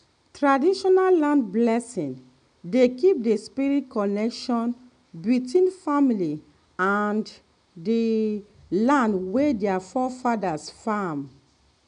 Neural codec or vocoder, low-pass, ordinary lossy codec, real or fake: none; 9.9 kHz; MP3, 96 kbps; real